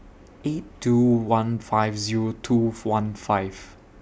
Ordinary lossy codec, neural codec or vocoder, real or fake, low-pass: none; none; real; none